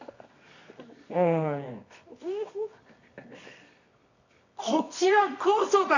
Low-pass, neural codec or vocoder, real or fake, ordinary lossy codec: 7.2 kHz; codec, 24 kHz, 0.9 kbps, WavTokenizer, medium music audio release; fake; none